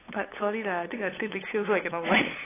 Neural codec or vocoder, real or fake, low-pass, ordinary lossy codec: codec, 16 kHz, 8 kbps, FunCodec, trained on LibriTTS, 25 frames a second; fake; 3.6 kHz; AAC, 16 kbps